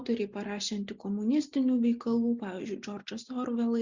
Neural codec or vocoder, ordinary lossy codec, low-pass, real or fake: none; Opus, 64 kbps; 7.2 kHz; real